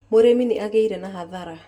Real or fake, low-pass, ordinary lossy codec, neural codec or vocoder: real; 19.8 kHz; none; none